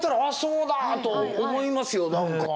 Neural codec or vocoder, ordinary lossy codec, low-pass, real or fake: none; none; none; real